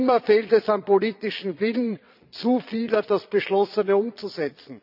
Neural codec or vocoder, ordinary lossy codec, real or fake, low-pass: vocoder, 22.05 kHz, 80 mel bands, Vocos; AAC, 48 kbps; fake; 5.4 kHz